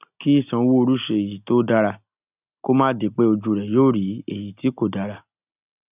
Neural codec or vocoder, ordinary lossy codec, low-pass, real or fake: none; none; 3.6 kHz; real